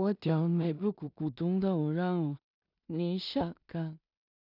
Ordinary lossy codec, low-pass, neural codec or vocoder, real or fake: none; 5.4 kHz; codec, 16 kHz in and 24 kHz out, 0.4 kbps, LongCat-Audio-Codec, two codebook decoder; fake